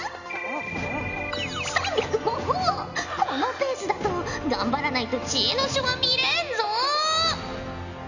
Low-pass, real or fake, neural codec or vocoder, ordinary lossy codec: 7.2 kHz; real; none; none